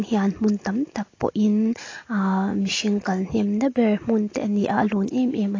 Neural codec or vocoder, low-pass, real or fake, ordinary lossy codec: none; 7.2 kHz; real; AAC, 32 kbps